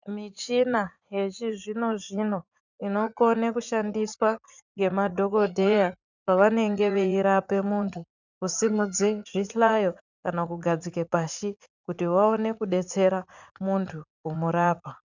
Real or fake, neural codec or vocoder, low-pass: fake; vocoder, 44.1 kHz, 80 mel bands, Vocos; 7.2 kHz